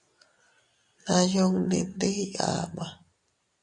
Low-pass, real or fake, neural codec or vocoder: 10.8 kHz; real; none